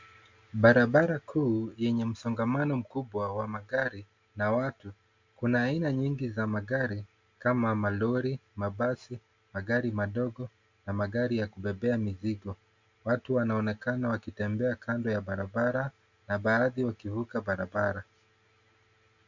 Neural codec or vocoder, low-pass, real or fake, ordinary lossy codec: none; 7.2 kHz; real; MP3, 64 kbps